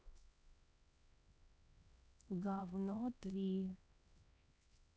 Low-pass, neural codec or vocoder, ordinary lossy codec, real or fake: none; codec, 16 kHz, 1 kbps, X-Codec, HuBERT features, trained on LibriSpeech; none; fake